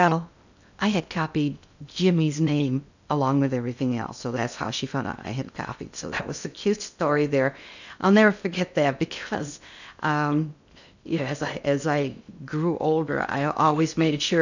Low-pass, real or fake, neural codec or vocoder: 7.2 kHz; fake; codec, 16 kHz in and 24 kHz out, 0.6 kbps, FocalCodec, streaming, 2048 codes